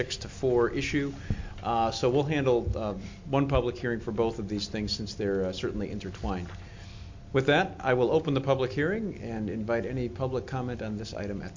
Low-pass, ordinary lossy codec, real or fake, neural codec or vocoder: 7.2 kHz; MP3, 48 kbps; real; none